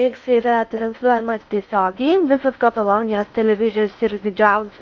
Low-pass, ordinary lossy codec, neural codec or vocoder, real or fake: 7.2 kHz; MP3, 64 kbps; codec, 16 kHz in and 24 kHz out, 0.6 kbps, FocalCodec, streaming, 4096 codes; fake